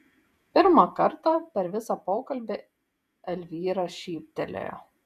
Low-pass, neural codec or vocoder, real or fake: 14.4 kHz; vocoder, 48 kHz, 128 mel bands, Vocos; fake